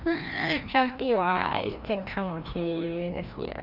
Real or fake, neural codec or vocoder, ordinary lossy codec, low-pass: fake; codec, 16 kHz, 1 kbps, FreqCodec, larger model; none; 5.4 kHz